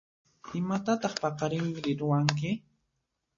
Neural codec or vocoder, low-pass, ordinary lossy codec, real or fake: none; 7.2 kHz; MP3, 32 kbps; real